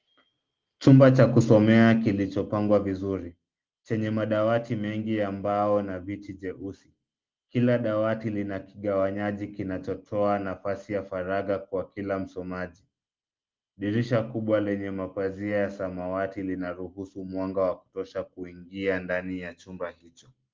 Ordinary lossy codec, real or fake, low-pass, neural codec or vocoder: Opus, 32 kbps; real; 7.2 kHz; none